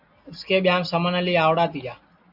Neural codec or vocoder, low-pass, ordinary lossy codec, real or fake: none; 5.4 kHz; AAC, 32 kbps; real